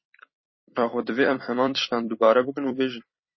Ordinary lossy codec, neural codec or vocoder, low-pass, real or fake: MP3, 24 kbps; vocoder, 44.1 kHz, 128 mel bands every 256 samples, BigVGAN v2; 7.2 kHz; fake